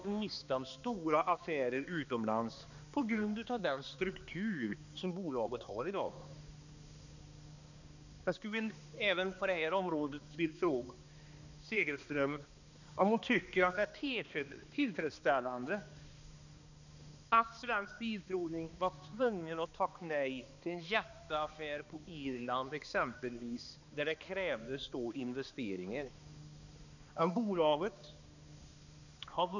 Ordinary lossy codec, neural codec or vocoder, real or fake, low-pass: none; codec, 16 kHz, 2 kbps, X-Codec, HuBERT features, trained on balanced general audio; fake; 7.2 kHz